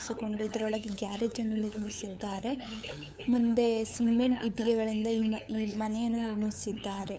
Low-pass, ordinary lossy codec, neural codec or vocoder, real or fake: none; none; codec, 16 kHz, 8 kbps, FunCodec, trained on LibriTTS, 25 frames a second; fake